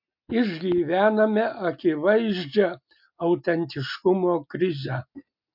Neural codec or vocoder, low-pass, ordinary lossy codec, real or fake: none; 5.4 kHz; MP3, 48 kbps; real